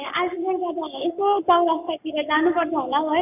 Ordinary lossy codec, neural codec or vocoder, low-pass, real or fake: none; codec, 44.1 kHz, 7.8 kbps, Pupu-Codec; 3.6 kHz; fake